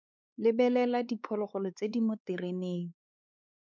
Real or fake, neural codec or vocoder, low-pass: fake; codec, 16 kHz, 4 kbps, X-Codec, WavLM features, trained on Multilingual LibriSpeech; 7.2 kHz